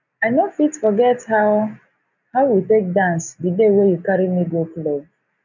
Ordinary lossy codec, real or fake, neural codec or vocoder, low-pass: none; real; none; 7.2 kHz